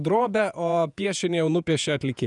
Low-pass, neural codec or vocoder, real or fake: 10.8 kHz; vocoder, 48 kHz, 128 mel bands, Vocos; fake